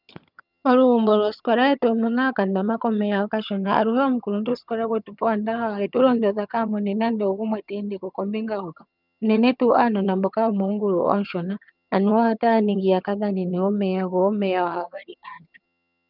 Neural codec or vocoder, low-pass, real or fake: vocoder, 22.05 kHz, 80 mel bands, HiFi-GAN; 5.4 kHz; fake